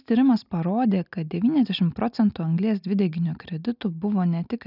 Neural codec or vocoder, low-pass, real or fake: none; 5.4 kHz; real